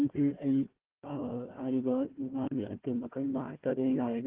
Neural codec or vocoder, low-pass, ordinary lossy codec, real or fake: codec, 16 kHz, 1 kbps, FunCodec, trained on Chinese and English, 50 frames a second; 3.6 kHz; Opus, 16 kbps; fake